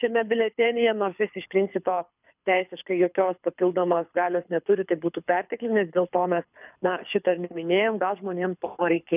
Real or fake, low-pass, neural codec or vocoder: fake; 3.6 kHz; codec, 24 kHz, 6 kbps, HILCodec